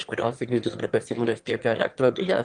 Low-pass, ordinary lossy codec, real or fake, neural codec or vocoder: 9.9 kHz; Opus, 24 kbps; fake; autoencoder, 22.05 kHz, a latent of 192 numbers a frame, VITS, trained on one speaker